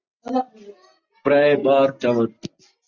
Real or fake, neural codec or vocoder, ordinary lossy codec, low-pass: real; none; Opus, 64 kbps; 7.2 kHz